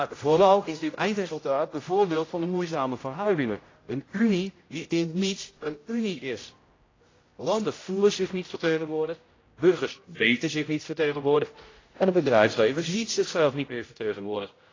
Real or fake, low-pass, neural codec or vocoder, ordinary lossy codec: fake; 7.2 kHz; codec, 16 kHz, 0.5 kbps, X-Codec, HuBERT features, trained on general audio; AAC, 32 kbps